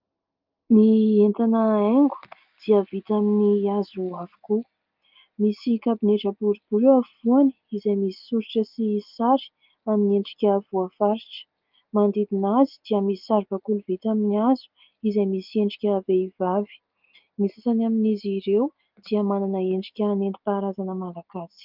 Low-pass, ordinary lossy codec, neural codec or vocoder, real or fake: 5.4 kHz; Opus, 32 kbps; none; real